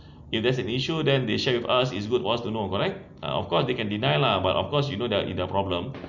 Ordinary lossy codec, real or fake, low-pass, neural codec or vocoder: none; real; 7.2 kHz; none